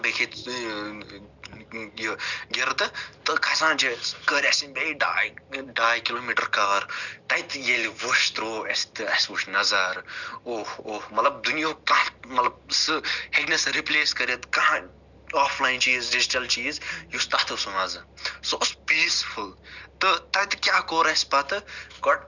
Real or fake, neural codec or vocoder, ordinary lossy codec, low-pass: real; none; none; 7.2 kHz